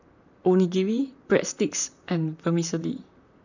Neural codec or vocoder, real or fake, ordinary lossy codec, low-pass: vocoder, 44.1 kHz, 128 mel bands, Pupu-Vocoder; fake; none; 7.2 kHz